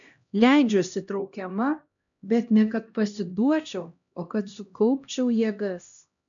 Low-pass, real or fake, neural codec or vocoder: 7.2 kHz; fake; codec, 16 kHz, 1 kbps, X-Codec, HuBERT features, trained on LibriSpeech